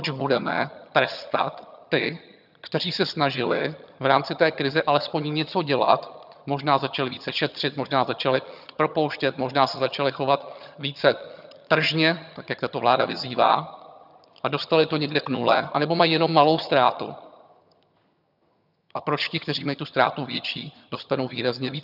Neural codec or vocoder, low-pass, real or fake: vocoder, 22.05 kHz, 80 mel bands, HiFi-GAN; 5.4 kHz; fake